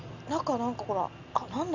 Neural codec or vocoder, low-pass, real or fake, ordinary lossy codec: none; 7.2 kHz; real; none